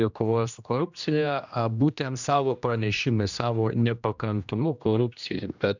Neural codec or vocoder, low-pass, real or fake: codec, 16 kHz, 1 kbps, X-Codec, HuBERT features, trained on general audio; 7.2 kHz; fake